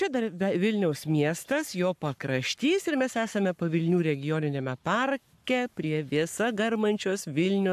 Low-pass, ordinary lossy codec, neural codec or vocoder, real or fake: 14.4 kHz; AAC, 96 kbps; codec, 44.1 kHz, 7.8 kbps, Pupu-Codec; fake